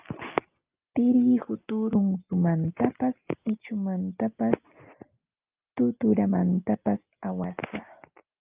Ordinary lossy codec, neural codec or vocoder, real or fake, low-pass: Opus, 24 kbps; none; real; 3.6 kHz